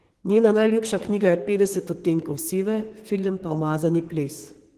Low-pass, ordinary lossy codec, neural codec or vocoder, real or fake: 14.4 kHz; Opus, 16 kbps; codec, 32 kHz, 1.9 kbps, SNAC; fake